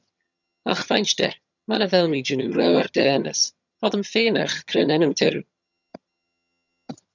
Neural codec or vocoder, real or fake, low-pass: vocoder, 22.05 kHz, 80 mel bands, HiFi-GAN; fake; 7.2 kHz